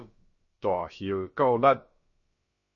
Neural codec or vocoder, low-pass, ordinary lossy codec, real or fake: codec, 16 kHz, about 1 kbps, DyCAST, with the encoder's durations; 7.2 kHz; MP3, 32 kbps; fake